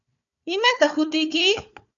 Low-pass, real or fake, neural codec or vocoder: 7.2 kHz; fake; codec, 16 kHz, 4 kbps, FunCodec, trained on Chinese and English, 50 frames a second